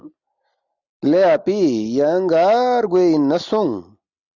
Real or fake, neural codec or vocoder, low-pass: real; none; 7.2 kHz